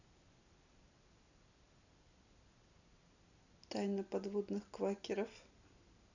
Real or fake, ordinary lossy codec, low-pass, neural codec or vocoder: real; none; 7.2 kHz; none